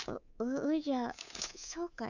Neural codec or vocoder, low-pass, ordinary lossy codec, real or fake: codec, 24 kHz, 3.1 kbps, DualCodec; 7.2 kHz; none; fake